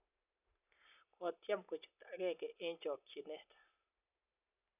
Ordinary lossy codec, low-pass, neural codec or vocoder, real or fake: none; 3.6 kHz; none; real